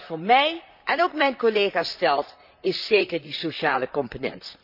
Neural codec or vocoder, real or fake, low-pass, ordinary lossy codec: vocoder, 44.1 kHz, 128 mel bands, Pupu-Vocoder; fake; 5.4 kHz; none